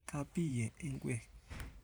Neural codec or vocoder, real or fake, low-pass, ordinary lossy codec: vocoder, 44.1 kHz, 128 mel bands every 512 samples, BigVGAN v2; fake; none; none